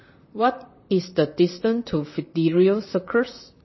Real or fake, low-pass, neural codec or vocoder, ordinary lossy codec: fake; 7.2 kHz; vocoder, 44.1 kHz, 128 mel bands, Pupu-Vocoder; MP3, 24 kbps